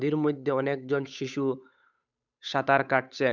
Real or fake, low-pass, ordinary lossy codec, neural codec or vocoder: fake; 7.2 kHz; none; codec, 16 kHz, 8 kbps, FunCodec, trained on Chinese and English, 25 frames a second